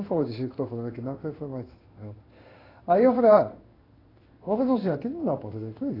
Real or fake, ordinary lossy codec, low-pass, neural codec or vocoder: fake; AAC, 24 kbps; 5.4 kHz; codec, 16 kHz in and 24 kHz out, 1 kbps, XY-Tokenizer